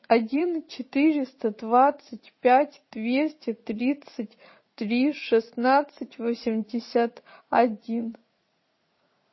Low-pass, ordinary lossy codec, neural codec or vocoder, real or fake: 7.2 kHz; MP3, 24 kbps; vocoder, 44.1 kHz, 80 mel bands, Vocos; fake